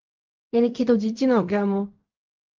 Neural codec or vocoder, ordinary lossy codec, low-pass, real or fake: codec, 16 kHz in and 24 kHz out, 0.4 kbps, LongCat-Audio-Codec, fine tuned four codebook decoder; Opus, 32 kbps; 7.2 kHz; fake